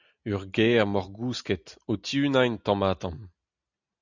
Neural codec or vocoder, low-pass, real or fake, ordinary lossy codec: none; 7.2 kHz; real; Opus, 64 kbps